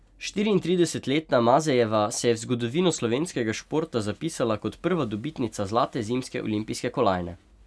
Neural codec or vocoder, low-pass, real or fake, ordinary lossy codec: none; none; real; none